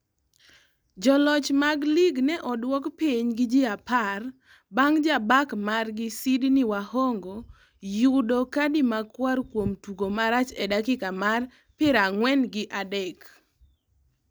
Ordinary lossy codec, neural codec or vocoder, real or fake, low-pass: none; none; real; none